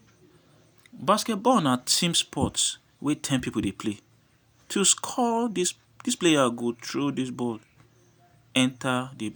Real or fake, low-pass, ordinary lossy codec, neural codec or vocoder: real; none; none; none